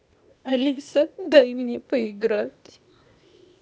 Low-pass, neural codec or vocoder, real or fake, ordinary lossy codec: none; codec, 16 kHz, 0.8 kbps, ZipCodec; fake; none